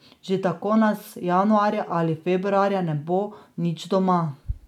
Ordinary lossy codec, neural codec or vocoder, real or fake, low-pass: none; none; real; 19.8 kHz